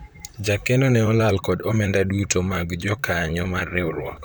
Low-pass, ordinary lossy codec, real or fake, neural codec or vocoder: none; none; fake; vocoder, 44.1 kHz, 128 mel bands, Pupu-Vocoder